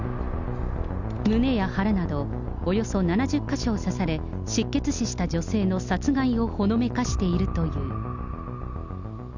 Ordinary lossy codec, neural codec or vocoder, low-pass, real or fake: none; none; 7.2 kHz; real